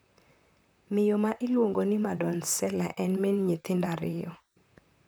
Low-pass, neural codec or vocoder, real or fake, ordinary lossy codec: none; vocoder, 44.1 kHz, 128 mel bands, Pupu-Vocoder; fake; none